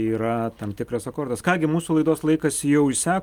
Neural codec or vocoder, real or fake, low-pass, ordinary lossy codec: none; real; 19.8 kHz; Opus, 32 kbps